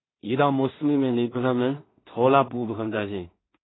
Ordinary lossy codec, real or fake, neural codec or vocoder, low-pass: AAC, 16 kbps; fake; codec, 16 kHz in and 24 kHz out, 0.4 kbps, LongCat-Audio-Codec, two codebook decoder; 7.2 kHz